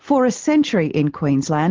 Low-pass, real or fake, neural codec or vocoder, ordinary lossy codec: 7.2 kHz; real; none; Opus, 16 kbps